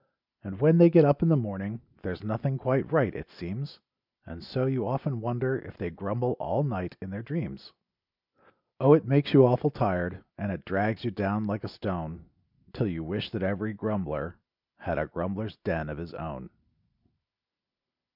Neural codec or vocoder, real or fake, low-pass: none; real; 5.4 kHz